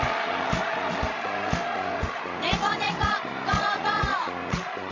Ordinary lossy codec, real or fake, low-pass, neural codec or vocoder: MP3, 48 kbps; fake; 7.2 kHz; codec, 16 kHz, 16 kbps, FreqCodec, larger model